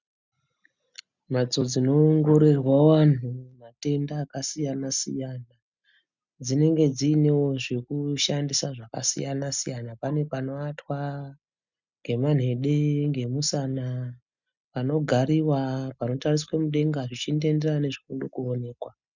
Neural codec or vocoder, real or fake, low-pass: none; real; 7.2 kHz